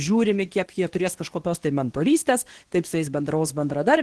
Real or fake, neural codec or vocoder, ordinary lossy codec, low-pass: fake; codec, 24 kHz, 0.9 kbps, WavTokenizer, medium speech release version 2; Opus, 16 kbps; 10.8 kHz